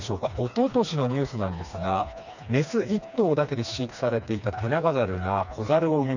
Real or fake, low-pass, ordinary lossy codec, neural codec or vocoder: fake; 7.2 kHz; none; codec, 16 kHz, 2 kbps, FreqCodec, smaller model